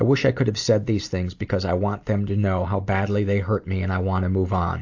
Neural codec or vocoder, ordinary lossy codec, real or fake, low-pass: none; AAC, 48 kbps; real; 7.2 kHz